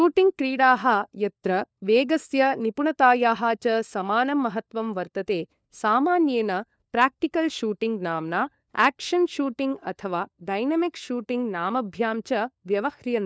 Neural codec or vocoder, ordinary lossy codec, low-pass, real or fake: codec, 16 kHz, 4 kbps, FunCodec, trained on LibriTTS, 50 frames a second; none; none; fake